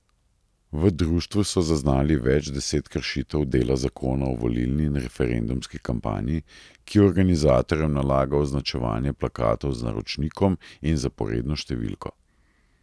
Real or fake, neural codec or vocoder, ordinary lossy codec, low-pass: real; none; none; none